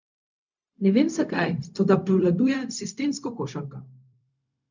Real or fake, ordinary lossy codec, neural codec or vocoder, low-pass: fake; none; codec, 16 kHz, 0.4 kbps, LongCat-Audio-Codec; 7.2 kHz